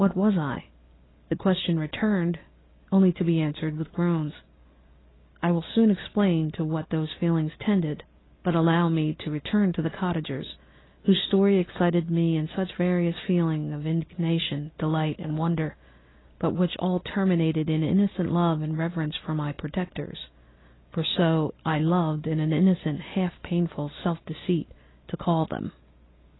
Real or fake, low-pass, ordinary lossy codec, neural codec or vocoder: real; 7.2 kHz; AAC, 16 kbps; none